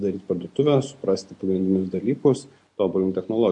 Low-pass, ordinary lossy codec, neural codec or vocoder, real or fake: 10.8 kHz; MP3, 48 kbps; none; real